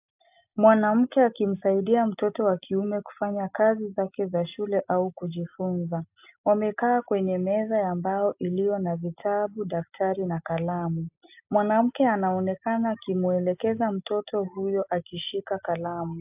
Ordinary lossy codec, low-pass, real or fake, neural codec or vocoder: AAC, 32 kbps; 3.6 kHz; real; none